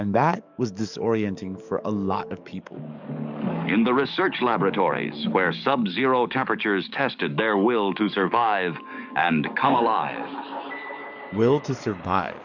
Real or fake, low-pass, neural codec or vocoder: fake; 7.2 kHz; codec, 44.1 kHz, 7.8 kbps, DAC